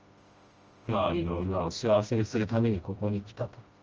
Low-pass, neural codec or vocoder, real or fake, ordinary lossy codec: 7.2 kHz; codec, 16 kHz, 1 kbps, FreqCodec, smaller model; fake; Opus, 24 kbps